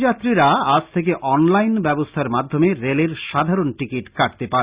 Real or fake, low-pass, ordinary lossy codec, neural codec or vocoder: real; 3.6 kHz; Opus, 64 kbps; none